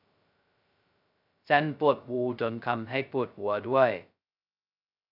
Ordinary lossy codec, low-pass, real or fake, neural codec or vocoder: none; 5.4 kHz; fake; codec, 16 kHz, 0.2 kbps, FocalCodec